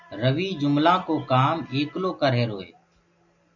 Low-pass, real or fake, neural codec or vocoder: 7.2 kHz; real; none